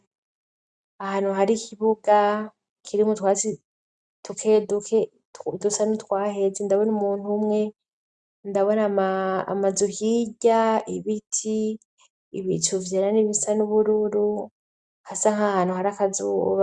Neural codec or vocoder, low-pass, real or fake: none; 9.9 kHz; real